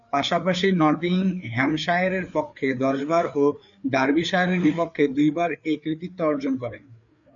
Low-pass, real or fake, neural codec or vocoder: 7.2 kHz; fake; codec, 16 kHz, 4 kbps, FreqCodec, larger model